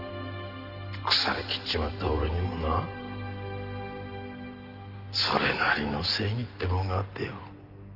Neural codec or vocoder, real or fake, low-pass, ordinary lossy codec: none; real; 5.4 kHz; Opus, 24 kbps